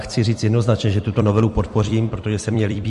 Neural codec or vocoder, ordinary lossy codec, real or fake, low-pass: vocoder, 44.1 kHz, 128 mel bands every 256 samples, BigVGAN v2; MP3, 48 kbps; fake; 14.4 kHz